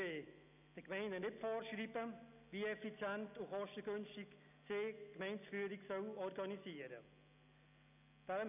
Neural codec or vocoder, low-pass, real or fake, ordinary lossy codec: none; 3.6 kHz; real; none